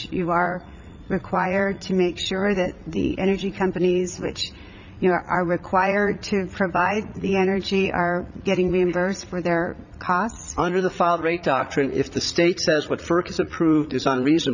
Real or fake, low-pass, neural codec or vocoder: fake; 7.2 kHz; vocoder, 44.1 kHz, 80 mel bands, Vocos